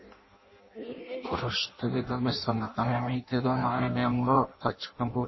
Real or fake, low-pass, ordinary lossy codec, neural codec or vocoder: fake; 7.2 kHz; MP3, 24 kbps; codec, 16 kHz in and 24 kHz out, 0.6 kbps, FireRedTTS-2 codec